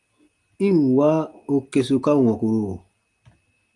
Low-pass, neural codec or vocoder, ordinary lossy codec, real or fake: 10.8 kHz; none; Opus, 32 kbps; real